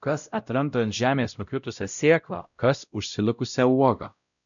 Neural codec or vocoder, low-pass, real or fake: codec, 16 kHz, 0.5 kbps, X-Codec, WavLM features, trained on Multilingual LibriSpeech; 7.2 kHz; fake